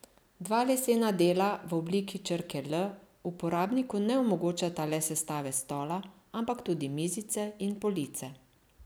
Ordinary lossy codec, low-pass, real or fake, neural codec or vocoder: none; none; real; none